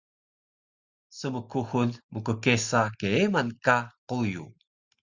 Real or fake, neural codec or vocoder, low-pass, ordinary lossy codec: real; none; 7.2 kHz; Opus, 64 kbps